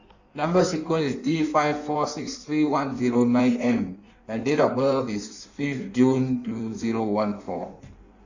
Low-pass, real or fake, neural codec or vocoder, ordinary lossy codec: 7.2 kHz; fake; codec, 16 kHz in and 24 kHz out, 1.1 kbps, FireRedTTS-2 codec; none